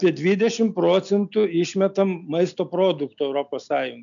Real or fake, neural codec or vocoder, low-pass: real; none; 7.2 kHz